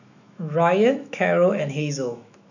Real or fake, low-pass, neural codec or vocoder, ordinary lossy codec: fake; 7.2 kHz; autoencoder, 48 kHz, 128 numbers a frame, DAC-VAE, trained on Japanese speech; none